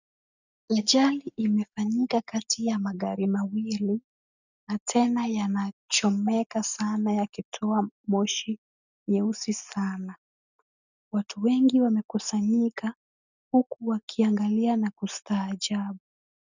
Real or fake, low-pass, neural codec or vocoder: real; 7.2 kHz; none